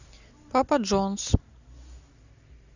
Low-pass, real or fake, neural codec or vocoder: 7.2 kHz; real; none